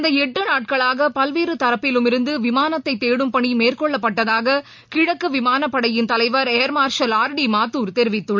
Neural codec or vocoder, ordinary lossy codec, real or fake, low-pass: none; MP3, 64 kbps; real; 7.2 kHz